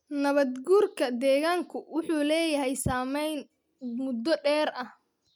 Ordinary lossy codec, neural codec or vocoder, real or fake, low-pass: MP3, 96 kbps; none; real; 19.8 kHz